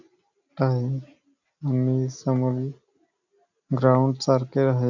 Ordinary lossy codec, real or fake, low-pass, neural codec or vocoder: none; real; 7.2 kHz; none